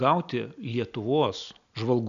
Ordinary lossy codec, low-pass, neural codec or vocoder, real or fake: MP3, 96 kbps; 7.2 kHz; none; real